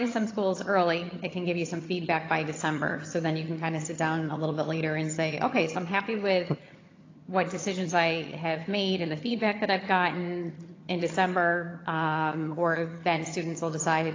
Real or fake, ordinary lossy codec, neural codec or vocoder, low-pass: fake; AAC, 32 kbps; vocoder, 22.05 kHz, 80 mel bands, HiFi-GAN; 7.2 kHz